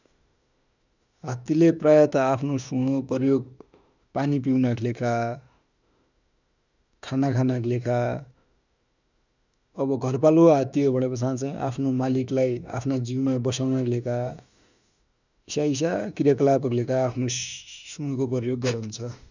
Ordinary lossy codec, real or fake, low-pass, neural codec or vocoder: none; fake; 7.2 kHz; autoencoder, 48 kHz, 32 numbers a frame, DAC-VAE, trained on Japanese speech